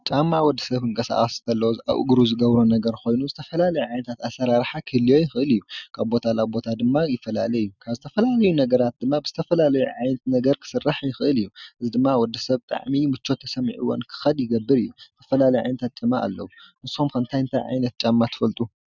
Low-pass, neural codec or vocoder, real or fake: 7.2 kHz; none; real